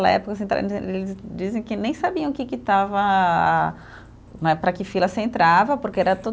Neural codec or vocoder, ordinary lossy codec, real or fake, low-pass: none; none; real; none